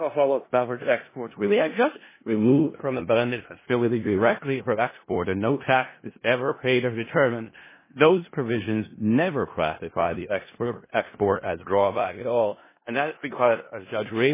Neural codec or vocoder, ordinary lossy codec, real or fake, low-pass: codec, 16 kHz in and 24 kHz out, 0.4 kbps, LongCat-Audio-Codec, four codebook decoder; MP3, 16 kbps; fake; 3.6 kHz